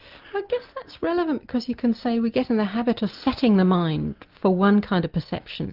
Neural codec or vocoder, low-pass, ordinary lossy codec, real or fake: none; 5.4 kHz; Opus, 24 kbps; real